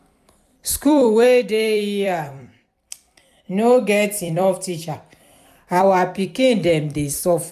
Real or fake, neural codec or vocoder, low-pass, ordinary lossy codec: fake; vocoder, 44.1 kHz, 128 mel bands every 256 samples, BigVGAN v2; 14.4 kHz; none